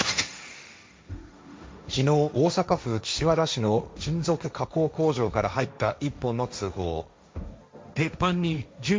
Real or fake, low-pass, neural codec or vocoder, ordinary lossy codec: fake; none; codec, 16 kHz, 1.1 kbps, Voila-Tokenizer; none